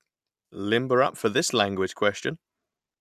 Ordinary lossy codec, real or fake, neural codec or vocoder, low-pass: none; real; none; 14.4 kHz